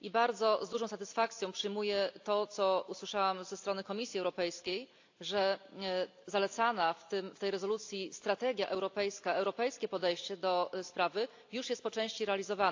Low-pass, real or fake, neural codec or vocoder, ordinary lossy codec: 7.2 kHz; real; none; AAC, 48 kbps